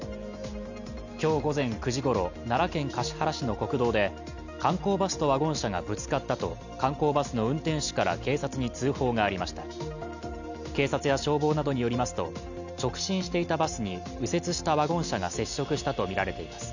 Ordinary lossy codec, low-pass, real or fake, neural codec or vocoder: none; 7.2 kHz; real; none